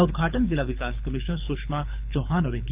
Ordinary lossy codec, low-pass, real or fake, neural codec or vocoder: Opus, 24 kbps; 3.6 kHz; fake; codec, 24 kHz, 6 kbps, HILCodec